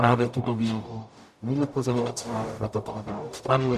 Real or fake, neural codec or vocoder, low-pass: fake; codec, 44.1 kHz, 0.9 kbps, DAC; 14.4 kHz